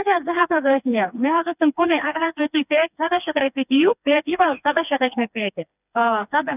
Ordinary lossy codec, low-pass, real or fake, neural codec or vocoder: none; 3.6 kHz; fake; codec, 16 kHz, 2 kbps, FreqCodec, smaller model